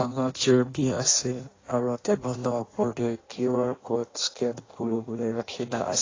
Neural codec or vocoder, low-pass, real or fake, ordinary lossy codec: codec, 16 kHz in and 24 kHz out, 0.6 kbps, FireRedTTS-2 codec; 7.2 kHz; fake; AAC, 32 kbps